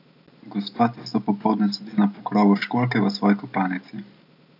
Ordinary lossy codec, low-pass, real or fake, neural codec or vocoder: none; 5.4 kHz; real; none